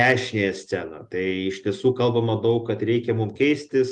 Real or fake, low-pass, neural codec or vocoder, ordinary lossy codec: real; 10.8 kHz; none; Opus, 32 kbps